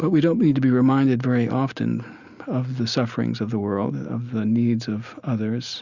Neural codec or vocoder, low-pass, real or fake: none; 7.2 kHz; real